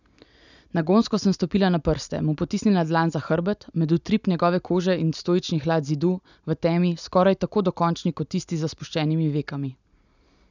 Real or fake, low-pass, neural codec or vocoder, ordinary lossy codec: real; 7.2 kHz; none; none